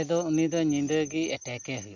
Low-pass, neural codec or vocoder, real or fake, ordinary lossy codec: 7.2 kHz; none; real; none